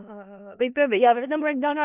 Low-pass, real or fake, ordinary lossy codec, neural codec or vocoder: 3.6 kHz; fake; none; codec, 16 kHz in and 24 kHz out, 0.4 kbps, LongCat-Audio-Codec, four codebook decoder